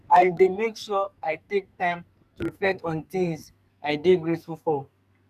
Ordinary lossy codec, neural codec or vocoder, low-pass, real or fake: none; codec, 44.1 kHz, 2.6 kbps, SNAC; 14.4 kHz; fake